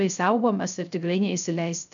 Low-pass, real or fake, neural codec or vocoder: 7.2 kHz; fake; codec, 16 kHz, 0.3 kbps, FocalCodec